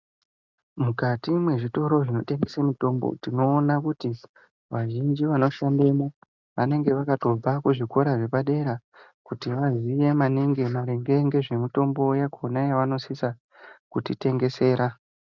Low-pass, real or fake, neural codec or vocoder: 7.2 kHz; real; none